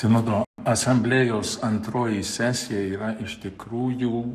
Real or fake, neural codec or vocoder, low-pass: fake; codec, 44.1 kHz, 7.8 kbps, Pupu-Codec; 14.4 kHz